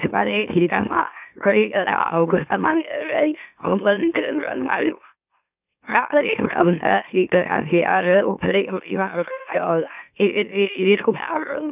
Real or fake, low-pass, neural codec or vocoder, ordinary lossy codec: fake; 3.6 kHz; autoencoder, 44.1 kHz, a latent of 192 numbers a frame, MeloTTS; none